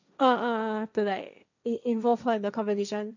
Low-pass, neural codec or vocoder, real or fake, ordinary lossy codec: 7.2 kHz; codec, 16 kHz, 1.1 kbps, Voila-Tokenizer; fake; none